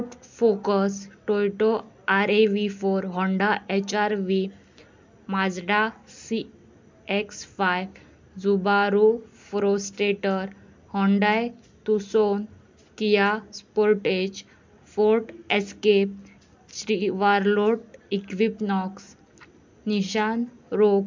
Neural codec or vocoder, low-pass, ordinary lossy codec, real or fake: none; 7.2 kHz; MP3, 64 kbps; real